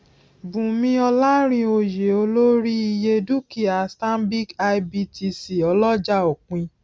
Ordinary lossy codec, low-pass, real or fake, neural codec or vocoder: none; none; real; none